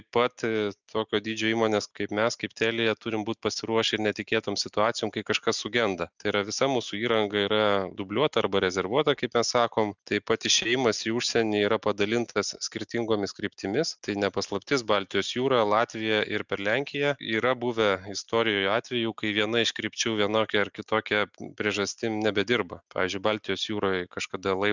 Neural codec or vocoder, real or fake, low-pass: none; real; 7.2 kHz